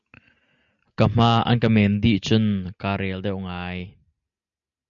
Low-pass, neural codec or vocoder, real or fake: 7.2 kHz; none; real